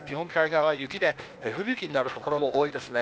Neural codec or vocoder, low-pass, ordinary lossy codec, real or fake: codec, 16 kHz, 0.8 kbps, ZipCodec; none; none; fake